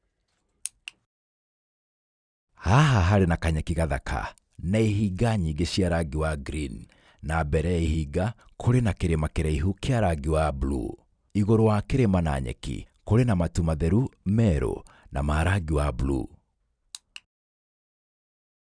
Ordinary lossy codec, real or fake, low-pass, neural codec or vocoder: none; real; 9.9 kHz; none